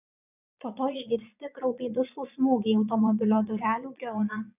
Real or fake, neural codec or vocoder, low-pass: fake; vocoder, 22.05 kHz, 80 mel bands, Vocos; 3.6 kHz